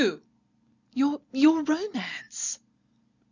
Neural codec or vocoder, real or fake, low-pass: none; real; 7.2 kHz